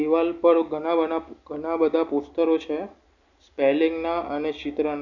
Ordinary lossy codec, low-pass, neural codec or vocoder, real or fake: none; 7.2 kHz; none; real